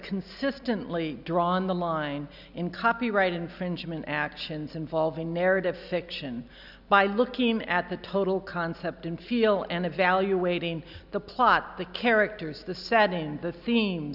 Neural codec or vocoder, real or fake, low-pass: none; real; 5.4 kHz